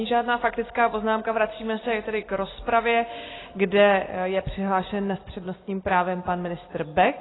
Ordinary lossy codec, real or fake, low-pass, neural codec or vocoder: AAC, 16 kbps; real; 7.2 kHz; none